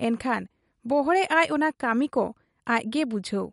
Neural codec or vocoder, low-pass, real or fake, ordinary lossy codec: none; 19.8 kHz; real; MP3, 48 kbps